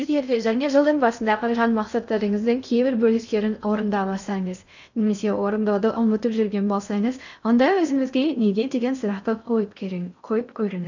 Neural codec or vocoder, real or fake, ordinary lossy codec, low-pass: codec, 16 kHz in and 24 kHz out, 0.6 kbps, FocalCodec, streaming, 2048 codes; fake; none; 7.2 kHz